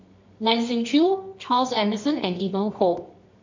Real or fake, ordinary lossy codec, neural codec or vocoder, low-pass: fake; MP3, 64 kbps; codec, 24 kHz, 0.9 kbps, WavTokenizer, medium music audio release; 7.2 kHz